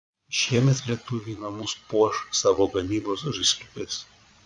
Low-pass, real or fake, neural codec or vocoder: 9.9 kHz; fake; vocoder, 22.05 kHz, 80 mel bands, Vocos